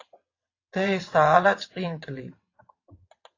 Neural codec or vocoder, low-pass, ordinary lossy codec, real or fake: vocoder, 22.05 kHz, 80 mel bands, WaveNeXt; 7.2 kHz; AAC, 32 kbps; fake